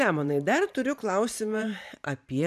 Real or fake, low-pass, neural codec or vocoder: fake; 14.4 kHz; vocoder, 44.1 kHz, 128 mel bands every 512 samples, BigVGAN v2